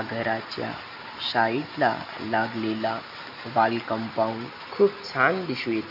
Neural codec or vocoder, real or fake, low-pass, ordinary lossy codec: none; real; 5.4 kHz; none